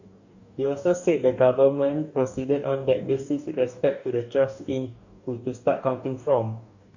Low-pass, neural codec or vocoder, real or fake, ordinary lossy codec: 7.2 kHz; codec, 44.1 kHz, 2.6 kbps, DAC; fake; none